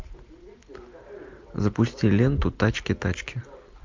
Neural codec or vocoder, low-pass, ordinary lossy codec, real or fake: none; 7.2 kHz; MP3, 64 kbps; real